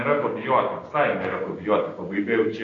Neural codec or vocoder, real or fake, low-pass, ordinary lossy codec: codec, 16 kHz, 6 kbps, DAC; fake; 7.2 kHz; AAC, 32 kbps